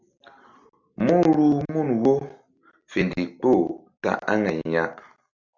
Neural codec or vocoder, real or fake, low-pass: none; real; 7.2 kHz